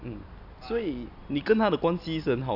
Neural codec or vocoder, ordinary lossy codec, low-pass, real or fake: none; none; 5.4 kHz; real